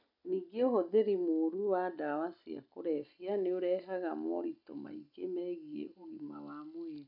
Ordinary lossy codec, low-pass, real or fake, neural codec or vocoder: none; 5.4 kHz; real; none